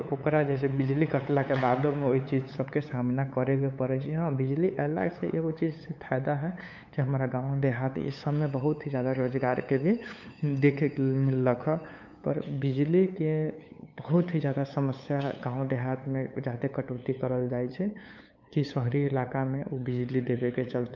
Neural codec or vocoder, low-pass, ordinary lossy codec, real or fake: codec, 16 kHz, 8 kbps, FunCodec, trained on LibriTTS, 25 frames a second; 7.2 kHz; MP3, 64 kbps; fake